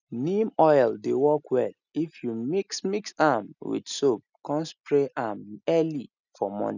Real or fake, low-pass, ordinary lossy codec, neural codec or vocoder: real; 7.2 kHz; none; none